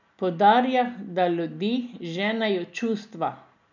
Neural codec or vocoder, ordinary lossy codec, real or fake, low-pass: none; none; real; 7.2 kHz